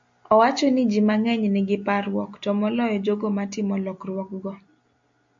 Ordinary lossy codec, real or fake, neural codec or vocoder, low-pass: MP3, 48 kbps; real; none; 7.2 kHz